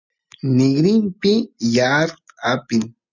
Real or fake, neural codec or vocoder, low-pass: real; none; 7.2 kHz